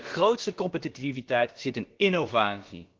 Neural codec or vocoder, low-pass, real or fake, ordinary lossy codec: codec, 16 kHz, about 1 kbps, DyCAST, with the encoder's durations; 7.2 kHz; fake; Opus, 16 kbps